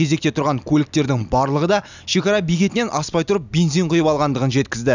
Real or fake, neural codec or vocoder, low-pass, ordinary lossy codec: real; none; 7.2 kHz; none